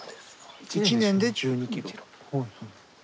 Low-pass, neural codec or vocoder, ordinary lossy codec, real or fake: none; none; none; real